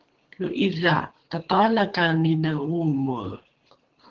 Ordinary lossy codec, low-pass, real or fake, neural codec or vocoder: Opus, 16 kbps; 7.2 kHz; fake; codec, 24 kHz, 3 kbps, HILCodec